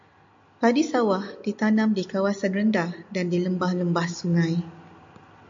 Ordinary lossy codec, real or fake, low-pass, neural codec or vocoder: MP3, 48 kbps; real; 7.2 kHz; none